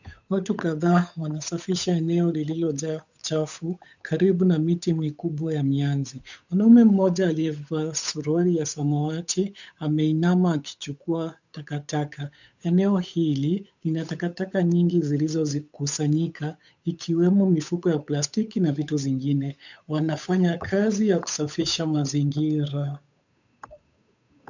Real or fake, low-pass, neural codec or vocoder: fake; 7.2 kHz; codec, 16 kHz, 8 kbps, FunCodec, trained on Chinese and English, 25 frames a second